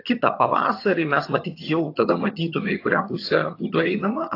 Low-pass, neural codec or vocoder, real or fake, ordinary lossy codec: 5.4 kHz; vocoder, 22.05 kHz, 80 mel bands, HiFi-GAN; fake; AAC, 32 kbps